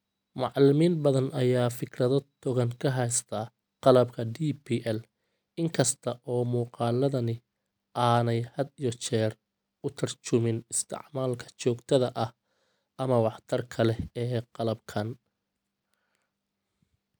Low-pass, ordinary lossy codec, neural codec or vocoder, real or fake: none; none; none; real